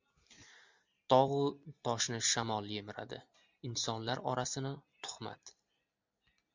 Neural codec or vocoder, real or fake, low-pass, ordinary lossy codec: none; real; 7.2 kHz; MP3, 64 kbps